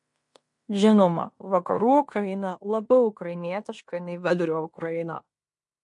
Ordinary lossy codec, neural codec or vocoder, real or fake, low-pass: MP3, 48 kbps; codec, 16 kHz in and 24 kHz out, 0.9 kbps, LongCat-Audio-Codec, fine tuned four codebook decoder; fake; 10.8 kHz